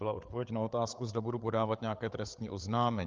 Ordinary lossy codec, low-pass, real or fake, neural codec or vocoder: Opus, 24 kbps; 7.2 kHz; fake; codec, 16 kHz, 8 kbps, FreqCodec, larger model